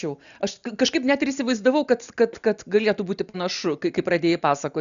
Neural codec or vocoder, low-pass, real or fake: none; 7.2 kHz; real